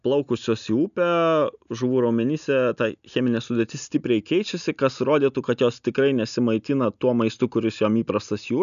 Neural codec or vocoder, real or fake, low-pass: none; real; 7.2 kHz